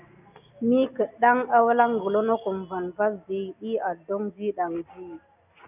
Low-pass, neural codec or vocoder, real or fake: 3.6 kHz; none; real